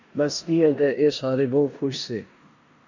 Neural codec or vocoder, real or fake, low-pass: codec, 16 kHz in and 24 kHz out, 0.9 kbps, LongCat-Audio-Codec, four codebook decoder; fake; 7.2 kHz